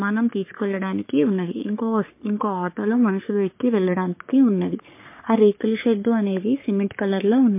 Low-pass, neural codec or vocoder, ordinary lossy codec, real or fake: 3.6 kHz; codec, 44.1 kHz, 3.4 kbps, Pupu-Codec; MP3, 24 kbps; fake